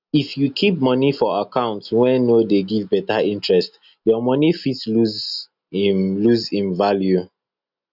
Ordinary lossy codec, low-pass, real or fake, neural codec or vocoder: none; 5.4 kHz; real; none